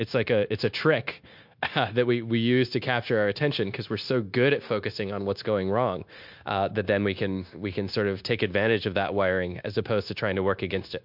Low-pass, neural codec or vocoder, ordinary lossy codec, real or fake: 5.4 kHz; codec, 16 kHz, 0.9 kbps, LongCat-Audio-Codec; MP3, 48 kbps; fake